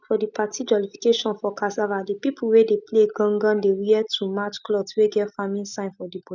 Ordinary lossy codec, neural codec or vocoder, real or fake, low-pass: none; none; real; 7.2 kHz